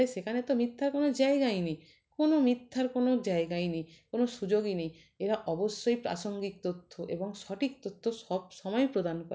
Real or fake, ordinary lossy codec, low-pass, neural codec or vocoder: real; none; none; none